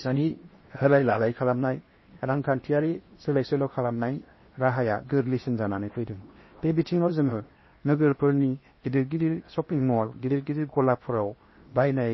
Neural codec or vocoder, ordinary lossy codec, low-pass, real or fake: codec, 16 kHz in and 24 kHz out, 0.8 kbps, FocalCodec, streaming, 65536 codes; MP3, 24 kbps; 7.2 kHz; fake